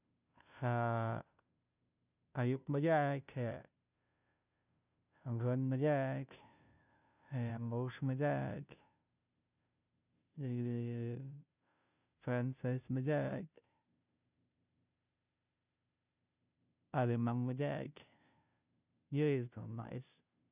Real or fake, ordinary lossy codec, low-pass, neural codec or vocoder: fake; none; 3.6 kHz; codec, 16 kHz, 0.5 kbps, FunCodec, trained on Chinese and English, 25 frames a second